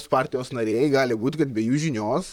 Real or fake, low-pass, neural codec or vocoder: fake; 19.8 kHz; vocoder, 44.1 kHz, 128 mel bands, Pupu-Vocoder